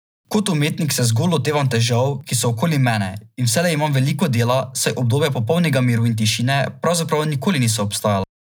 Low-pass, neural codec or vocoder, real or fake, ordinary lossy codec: none; none; real; none